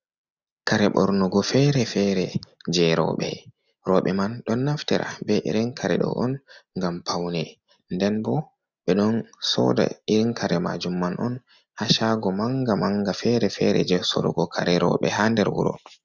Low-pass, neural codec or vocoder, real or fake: 7.2 kHz; none; real